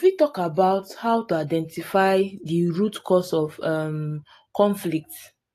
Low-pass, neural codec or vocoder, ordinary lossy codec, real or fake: 14.4 kHz; none; AAC, 48 kbps; real